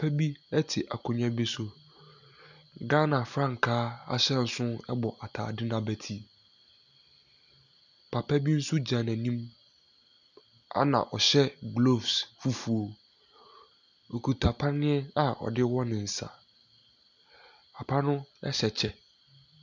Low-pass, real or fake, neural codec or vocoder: 7.2 kHz; real; none